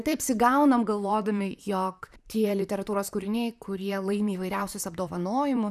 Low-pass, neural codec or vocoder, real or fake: 14.4 kHz; vocoder, 44.1 kHz, 128 mel bands, Pupu-Vocoder; fake